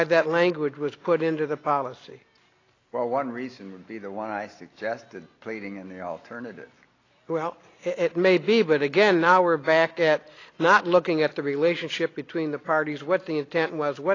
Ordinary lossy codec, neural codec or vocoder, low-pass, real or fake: AAC, 32 kbps; none; 7.2 kHz; real